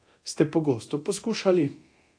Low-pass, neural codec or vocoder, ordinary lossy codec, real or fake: 9.9 kHz; codec, 24 kHz, 0.9 kbps, DualCodec; AAC, 48 kbps; fake